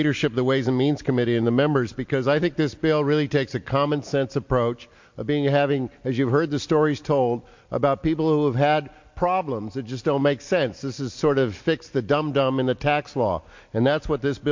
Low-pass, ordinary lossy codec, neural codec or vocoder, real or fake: 7.2 kHz; MP3, 48 kbps; none; real